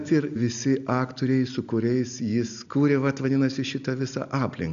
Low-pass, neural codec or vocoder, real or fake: 7.2 kHz; none; real